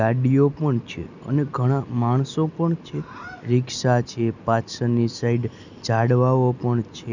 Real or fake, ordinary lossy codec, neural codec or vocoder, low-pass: real; none; none; 7.2 kHz